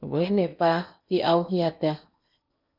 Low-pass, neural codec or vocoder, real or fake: 5.4 kHz; codec, 16 kHz in and 24 kHz out, 0.8 kbps, FocalCodec, streaming, 65536 codes; fake